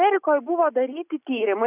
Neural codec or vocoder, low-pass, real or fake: none; 3.6 kHz; real